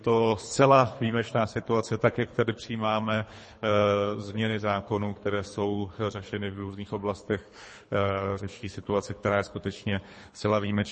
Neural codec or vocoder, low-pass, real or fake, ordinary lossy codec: codec, 24 kHz, 3 kbps, HILCodec; 10.8 kHz; fake; MP3, 32 kbps